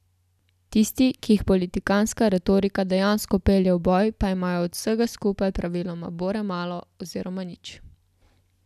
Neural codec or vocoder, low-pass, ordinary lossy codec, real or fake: none; 14.4 kHz; none; real